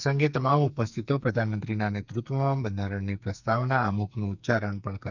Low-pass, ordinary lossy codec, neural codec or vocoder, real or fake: 7.2 kHz; none; codec, 44.1 kHz, 2.6 kbps, SNAC; fake